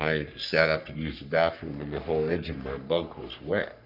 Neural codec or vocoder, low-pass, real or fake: codec, 44.1 kHz, 3.4 kbps, Pupu-Codec; 5.4 kHz; fake